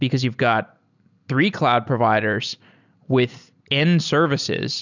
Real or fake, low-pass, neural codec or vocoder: real; 7.2 kHz; none